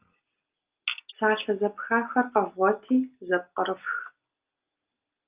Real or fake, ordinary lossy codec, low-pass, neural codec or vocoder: real; Opus, 24 kbps; 3.6 kHz; none